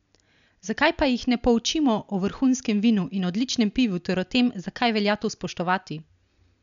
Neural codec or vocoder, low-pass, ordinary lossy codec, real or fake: none; 7.2 kHz; none; real